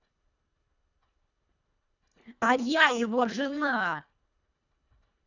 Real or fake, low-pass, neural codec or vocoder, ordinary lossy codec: fake; 7.2 kHz; codec, 24 kHz, 1.5 kbps, HILCodec; none